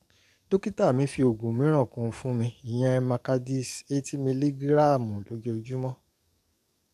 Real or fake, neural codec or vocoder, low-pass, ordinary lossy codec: fake; codec, 44.1 kHz, 7.8 kbps, DAC; 14.4 kHz; none